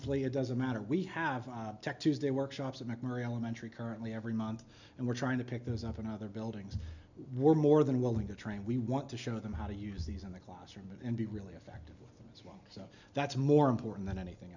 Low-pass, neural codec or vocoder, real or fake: 7.2 kHz; none; real